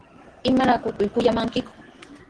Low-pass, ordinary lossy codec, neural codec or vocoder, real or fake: 9.9 kHz; Opus, 16 kbps; none; real